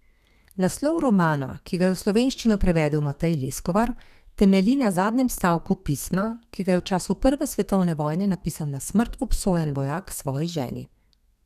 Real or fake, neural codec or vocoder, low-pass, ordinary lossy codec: fake; codec, 32 kHz, 1.9 kbps, SNAC; 14.4 kHz; none